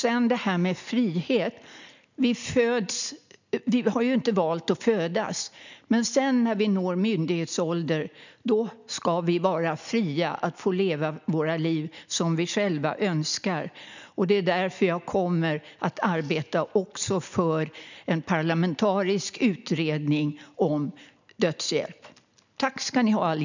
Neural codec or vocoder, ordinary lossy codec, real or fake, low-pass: none; none; real; 7.2 kHz